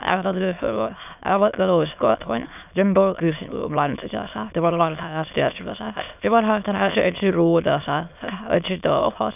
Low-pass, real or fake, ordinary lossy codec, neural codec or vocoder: 3.6 kHz; fake; none; autoencoder, 22.05 kHz, a latent of 192 numbers a frame, VITS, trained on many speakers